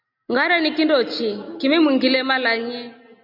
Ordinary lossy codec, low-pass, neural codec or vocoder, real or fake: MP3, 48 kbps; 5.4 kHz; none; real